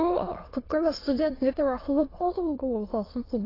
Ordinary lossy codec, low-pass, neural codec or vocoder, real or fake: AAC, 24 kbps; 5.4 kHz; autoencoder, 22.05 kHz, a latent of 192 numbers a frame, VITS, trained on many speakers; fake